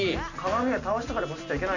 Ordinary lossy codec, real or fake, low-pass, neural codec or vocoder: none; real; 7.2 kHz; none